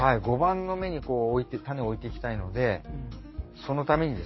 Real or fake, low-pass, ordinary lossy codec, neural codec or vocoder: fake; 7.2 kHz; MP3, 24 kbps; codec, 44.1 kHz, 7.8 kbps, DAC